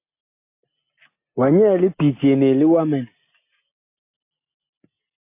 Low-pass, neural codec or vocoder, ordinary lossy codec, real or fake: 3.6 kHz; none; MP3, 24 kbps; real